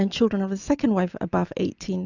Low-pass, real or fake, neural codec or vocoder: 7.2 kHz; real; none